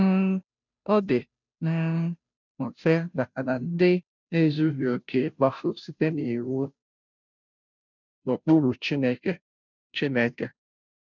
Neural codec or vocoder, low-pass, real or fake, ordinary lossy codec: codec, 16 kHz, 0.5 kbps, FunCodec, trained on Chinese and English, 25 frames a second; 7.2 kHz; fake; none